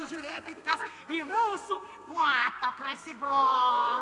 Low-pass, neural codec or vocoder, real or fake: 10.8 kHz; codec, 32 kHz, 1.9 kbps, SNAC; fake